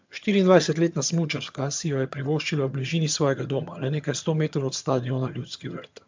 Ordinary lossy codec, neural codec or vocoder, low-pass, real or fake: MP3, 64 kbps; vocoder, 22.05 kHz, 80 mel bands, HiFi-GAN; 7.2 kHz; fake